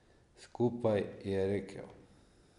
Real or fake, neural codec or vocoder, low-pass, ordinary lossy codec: real; none; 10.8 kHz; none